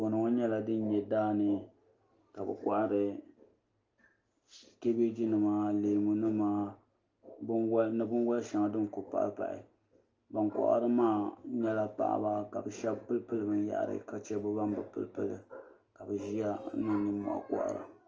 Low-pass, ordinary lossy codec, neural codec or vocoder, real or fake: 7.2 kHz; Opus, 24 kbps; none; real